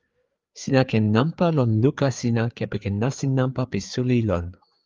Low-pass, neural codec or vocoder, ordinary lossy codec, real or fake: 7.2 kHz; codec, 16 kHz, 4 kbps, FreqCodec, larger model; Opus, 32 kbps; fake